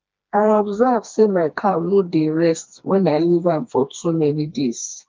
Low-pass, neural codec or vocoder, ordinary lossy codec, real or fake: 7.2 kHz; codec, 16 kHz, 2 kbps, FreqCodec, smaller model; Opus, 32 kbps; fake